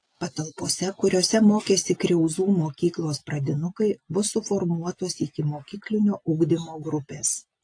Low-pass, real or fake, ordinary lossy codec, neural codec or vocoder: 9.9 kHz; real; AAC, 48 kbps; none